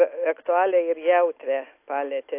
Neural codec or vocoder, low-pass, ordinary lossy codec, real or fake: none; 3.6 kHz; AAC, 24 kbps; real